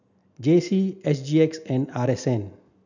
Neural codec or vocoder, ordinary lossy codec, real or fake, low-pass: none; none; real; 7.2 kHz